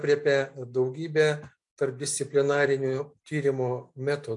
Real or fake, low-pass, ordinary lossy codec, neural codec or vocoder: real; 10.8 kHz; MP3, 64 kbps; none